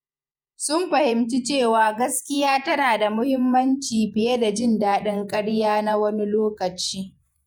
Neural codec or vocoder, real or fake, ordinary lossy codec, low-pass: vocoder, 48 kHz, 128 mel bands, Vocos; fake; none; none